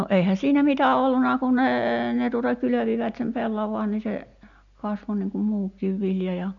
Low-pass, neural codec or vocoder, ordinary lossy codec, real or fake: 7.2 kHz; none; none; real